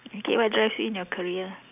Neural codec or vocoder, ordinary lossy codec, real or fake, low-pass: none; none; real; 3.6 kHz